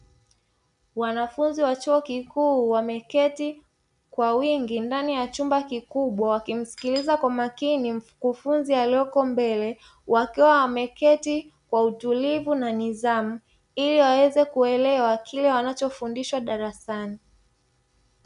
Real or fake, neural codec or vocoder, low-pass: real; none; 10.8 kHz